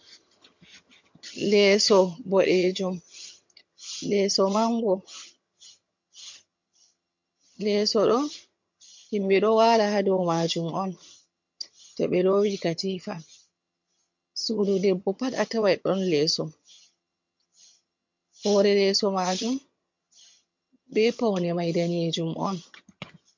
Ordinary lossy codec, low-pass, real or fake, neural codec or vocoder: MP3, 48 kbps; 7.2 kHz; fake; vocoder, 22.05 kHz, 80 mel bands, HiFi-GAN